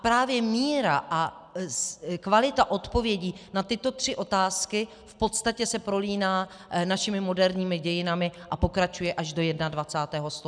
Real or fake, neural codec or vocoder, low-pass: real; none; 9.9 kHz